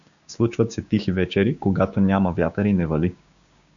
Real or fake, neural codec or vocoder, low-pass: fake; codec, 16 kHz, 6 kbps, DAC; 7.2 kHz